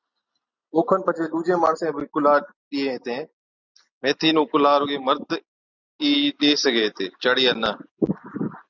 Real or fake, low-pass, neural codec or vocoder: real; 7.2 kHz; none